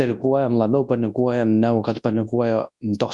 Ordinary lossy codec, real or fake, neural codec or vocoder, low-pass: Opus, 64 kbps; fake; codec, 24 kHz, 0.9 kbps, WavTokenizer, large speech release; 10.8 kHz